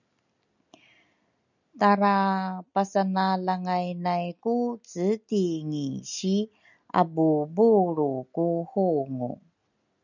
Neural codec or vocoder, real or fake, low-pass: none; real; 7.2 kHz